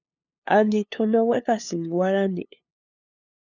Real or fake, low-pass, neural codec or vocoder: fake; 7.2 kHz; codec, 16 kHz, 2 kbps, FunCodec, trained on LibriTTS, 25 frames a second